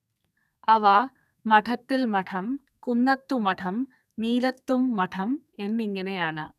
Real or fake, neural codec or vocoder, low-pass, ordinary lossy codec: fake; codec, 32 kHz, 1.9 kbps, SNAC; 14.4 kHz; none